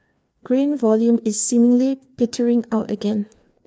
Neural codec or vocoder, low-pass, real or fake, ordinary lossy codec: codec, 16 kHz, 2 kbps, FreqCodec, larger model; none; fake; none